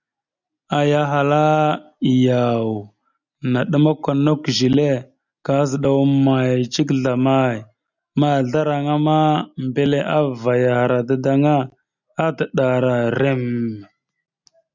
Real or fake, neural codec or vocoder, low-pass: real; none; 7.2 kHz